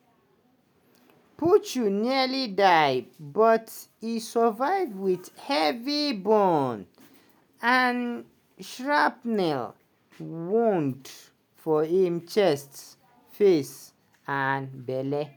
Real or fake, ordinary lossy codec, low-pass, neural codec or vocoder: real; none; none; none